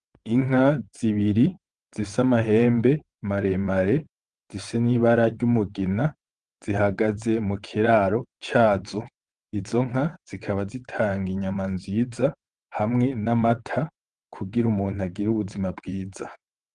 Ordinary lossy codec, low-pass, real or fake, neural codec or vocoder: Opus, 24 kbps; 9.9 kHz; fake; vocoder, 22.05 kHz, 80 mel bands, WaveNeXt